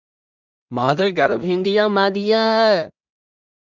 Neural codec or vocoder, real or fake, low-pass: codec, 16 kHz in and 24 kHz out, 0.4 kbps, LongCat-Audio-Codec, two codebook decoder; fake; 7.2 kHz